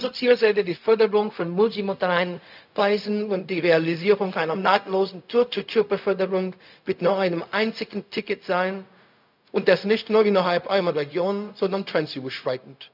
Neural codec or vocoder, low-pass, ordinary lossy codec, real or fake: codec, 16 kHz, 0.4 kbps, LongCat-Audio-Codec; 5.4 kHz; none; fake